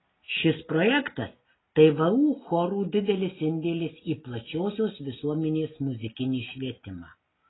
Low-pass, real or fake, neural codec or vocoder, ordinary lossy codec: 7.2 kHz; real; none; AAC, 16 kbps